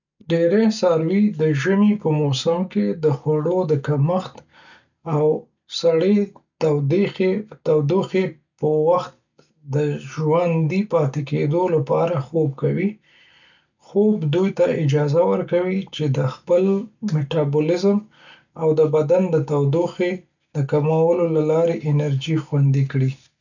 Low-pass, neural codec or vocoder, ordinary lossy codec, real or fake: 7.2 kHz; none; none; real